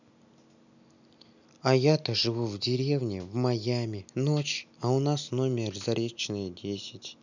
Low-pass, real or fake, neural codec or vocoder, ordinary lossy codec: 7.2 kHz; real; none; none